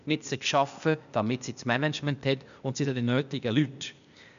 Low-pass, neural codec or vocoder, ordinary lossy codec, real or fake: 7.2 kHz; codec, 16 kHz, 0.8 kbps, ZipCodec; none; fake